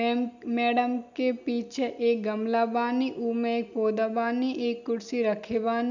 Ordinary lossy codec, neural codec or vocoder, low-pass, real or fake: none; none; 7.2 kHz; real